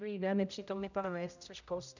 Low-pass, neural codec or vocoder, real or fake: 7.2 kHz; codec, 16 kHz, 0.5 kbps, X-Codec, HuBERT features, trained on general audio; fake